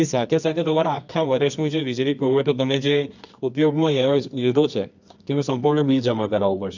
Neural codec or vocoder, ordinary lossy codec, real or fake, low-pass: codec, 24 kHz, 0.9 kbps, WavTokenizer, medium music audio release; none; fake; 7.2 kHz